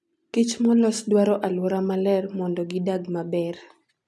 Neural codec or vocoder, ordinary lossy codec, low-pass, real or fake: none; none; none; real